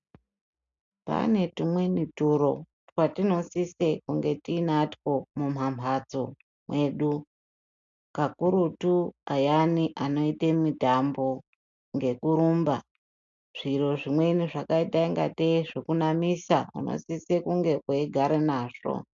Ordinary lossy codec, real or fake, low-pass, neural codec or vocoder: MP3, 64 kbps; real; 7.2 kHz; none